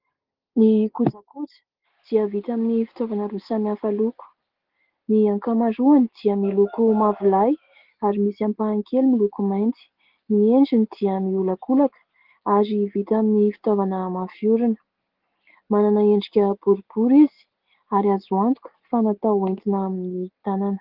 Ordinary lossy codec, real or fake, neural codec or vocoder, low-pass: Opus, 16 kbps; real; none; 5.4 kHz